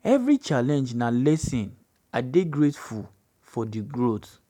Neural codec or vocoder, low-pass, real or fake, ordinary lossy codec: none; 19.8 kHz; real; none